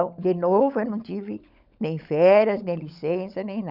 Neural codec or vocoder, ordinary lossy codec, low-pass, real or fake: codec, 16 kHz, 16 kbps, FunCodec, trained on LibriTTS, 50 frames a second; none; 5.4 kHz; fake